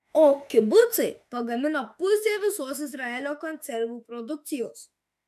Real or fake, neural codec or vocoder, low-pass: fake; autoencoder, 48 kHz, 32 numbers a frame, DAC-VAE, trained on Japanese speech; 14.4 kHz